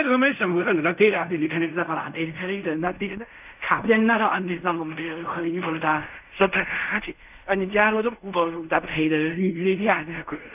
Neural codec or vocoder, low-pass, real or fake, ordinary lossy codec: codec, 16 kHz in and 24 kHz out, 0.4 kbps, LongCat-Audio-Codec, fine tuned four codebook decoder; 3.6 kHz; fake; none